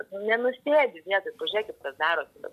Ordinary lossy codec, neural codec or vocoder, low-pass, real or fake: MP3, 96 kbps; none; 14.4 kHz; real